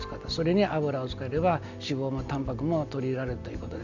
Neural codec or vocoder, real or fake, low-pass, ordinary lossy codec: none; real; 7.2 kHz; none